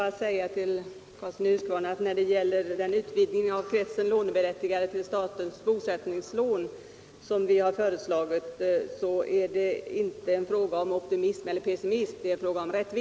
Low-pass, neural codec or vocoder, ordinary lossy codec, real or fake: none; none; none; real